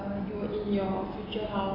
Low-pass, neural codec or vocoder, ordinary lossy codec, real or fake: 5.4 kHz; none; AAC, 24 kbps; real